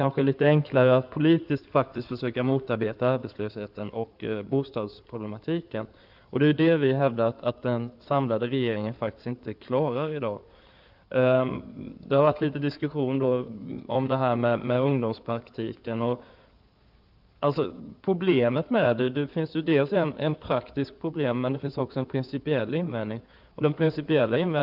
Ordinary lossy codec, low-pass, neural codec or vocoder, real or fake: none; 5.4 kHz; codec, 16 kHz in and 24 kHz out, 2.2 kbps, FireRedTTS-2 codec; fake